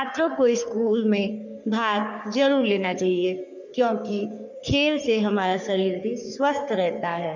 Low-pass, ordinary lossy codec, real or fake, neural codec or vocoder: 7.2 kHz; none; fake; codec, 44.1 kHz, 3.4 kbps, Pupu-Codec